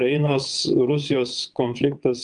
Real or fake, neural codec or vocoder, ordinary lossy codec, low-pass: fake; vocoder, 22.05 kHz, 80 mel bands, WaveNeXt; Opus, 32 kbps; 9.9 kHz